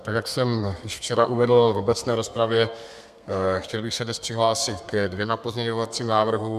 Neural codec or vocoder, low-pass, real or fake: codec, 32 kHz, 1.9 kbps, SNAC; 14.4 kHz; fake